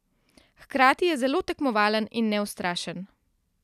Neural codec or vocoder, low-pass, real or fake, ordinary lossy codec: none; 14.4 kHz; real; none